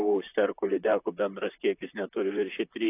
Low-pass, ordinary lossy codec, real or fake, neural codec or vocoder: 3.6 kHz; AAC, 24 kbps; fake; vocoder, 44.1 kHz, 128 mel bands, Pupu-Vocoder